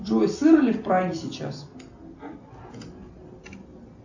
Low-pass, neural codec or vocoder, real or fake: 7.2 kHz; none; real